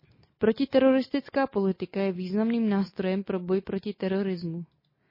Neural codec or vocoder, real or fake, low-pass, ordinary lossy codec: none; real; 5.4 kHz; MP3, 24 kbps